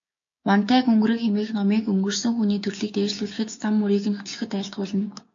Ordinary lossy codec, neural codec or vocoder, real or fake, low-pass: AAC, 48 kbps; codec, 16 kHz, 6 kbps, DAC; fake; 7.2 kHz